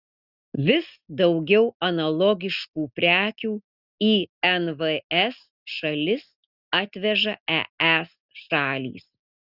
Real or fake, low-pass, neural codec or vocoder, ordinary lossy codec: real; 5.4 kHz; none; Opus, 64 kbps